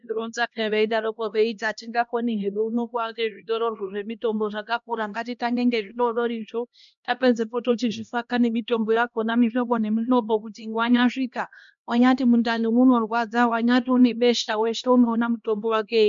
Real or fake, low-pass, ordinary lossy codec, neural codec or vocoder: fake; 7.2 kHz; MP3, 64 kbps; codec, 16 kHz, 1 kbps, X-Codec, HuBERT features, trained on LibriSpeech